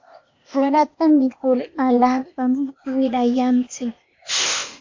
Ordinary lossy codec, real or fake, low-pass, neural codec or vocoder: MP3, 48 kbps; fake; 7.2 kHz; codec, 16 kHz, 0.8 kbps, ZipCodec